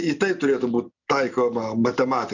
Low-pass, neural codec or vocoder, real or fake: 7.2 kHz; none; real